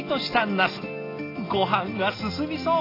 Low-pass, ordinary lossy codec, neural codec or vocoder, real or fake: 5.4 kHz; AAC, 24 kbps; none; real